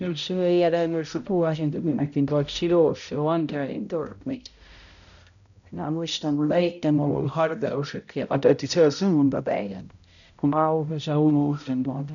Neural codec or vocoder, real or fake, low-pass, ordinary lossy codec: codec, 16 kHz, 0.5 kbps, X-Codec, HuBERT features, trained on balanced general audio; fake; 7.2 kHz; none